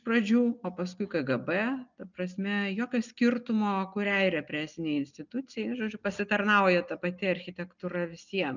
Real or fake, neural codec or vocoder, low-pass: real; none; 7.2 kHz